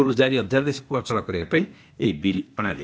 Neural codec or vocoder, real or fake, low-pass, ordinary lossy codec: codec, 16 kHz, 0.8 kbps, ZipCodec; fake; none; none